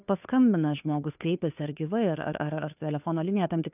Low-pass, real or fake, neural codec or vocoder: 3.6 kHz; fake; codec, 16 kHz, 2 kbps, FunCodec, trained on Chinese and English, 25 frames a second